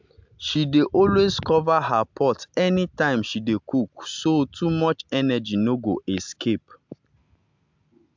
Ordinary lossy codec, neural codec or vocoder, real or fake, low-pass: MP3, 64 kbps; none; real; 7.2 kHz